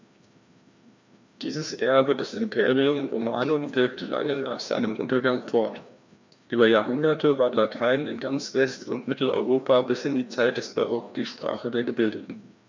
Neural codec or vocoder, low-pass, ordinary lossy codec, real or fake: codec, 16 kHz, 1 kbps, FreqCodec, larger model; 7.2 kHz; MP3, 64 kbps; fake